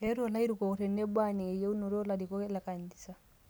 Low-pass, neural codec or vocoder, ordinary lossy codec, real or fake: none; none; none; real